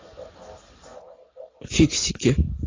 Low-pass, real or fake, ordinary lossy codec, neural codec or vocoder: 7.2 kHz; real; AAC, 32 kbps; none